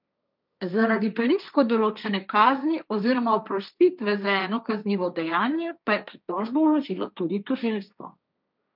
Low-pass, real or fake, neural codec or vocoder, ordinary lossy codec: 5.4 kHz; fake; codec, 16 kHz, 1.1 kbps, Voila-Tokenizer; none